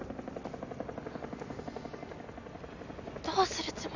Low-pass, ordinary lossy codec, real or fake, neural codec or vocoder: 7.2 kHz; none; real; none